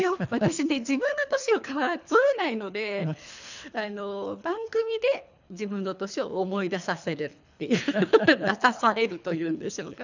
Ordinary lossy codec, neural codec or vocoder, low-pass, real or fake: none; codec, 24 kHz, 3 kbps, HILCodec; 7.2 kHz; fake